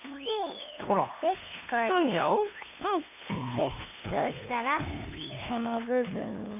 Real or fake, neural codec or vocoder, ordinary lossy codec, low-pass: fake; codec, 16 kHz, 2 kbps, FunCodec, trained on LibriTTS, 25 frames a second; AAC, 32 kbps; 3.6 kHz